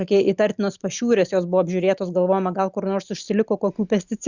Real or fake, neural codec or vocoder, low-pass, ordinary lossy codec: real; none; 7.2 kHz; Opus, 64 kbps